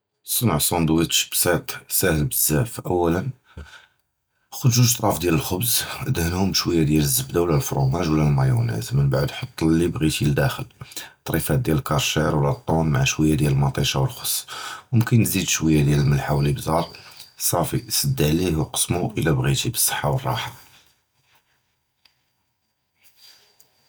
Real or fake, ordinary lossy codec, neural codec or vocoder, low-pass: real; none; none; none